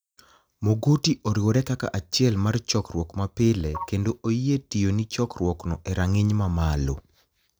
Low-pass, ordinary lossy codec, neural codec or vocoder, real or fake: none; none; none; real